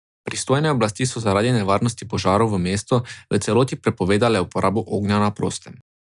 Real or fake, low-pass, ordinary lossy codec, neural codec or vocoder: real; 10.8 kHz; none; none